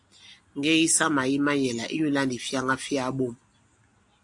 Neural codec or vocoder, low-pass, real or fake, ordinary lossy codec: none; 10.8 kHz; real; AAC, 64 kbps